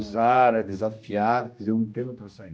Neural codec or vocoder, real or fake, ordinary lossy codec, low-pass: codec, 16 kHz, 1 kbps, X-Codec, HuBERT features, trained on general audio; fake; none; none